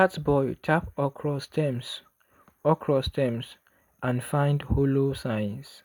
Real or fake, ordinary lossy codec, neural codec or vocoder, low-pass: real; none; none; none